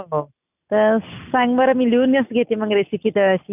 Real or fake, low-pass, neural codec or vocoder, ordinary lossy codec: real; 3.6 kHz; none; none